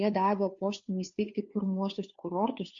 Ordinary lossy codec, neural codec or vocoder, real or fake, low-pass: MP3, 48 kbps; codec, 16 kHz, 2 kbps, FunCodec, trained on Chinese and English, 25 frames a second; fake; 7.2 kHz